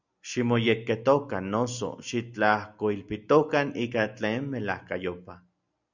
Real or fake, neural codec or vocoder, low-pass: real; none; 7.2 kHz